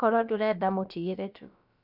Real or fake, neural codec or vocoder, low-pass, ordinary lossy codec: fake; codec, 16 kHz, about 1 kbps, DyCAST, with the encoder's durations; 5.4 kHz; none